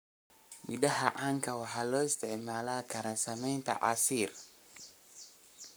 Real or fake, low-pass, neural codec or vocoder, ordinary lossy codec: fake; none; codec, 44.1 kHz, 7.8 kbps, Pupu-Codec; none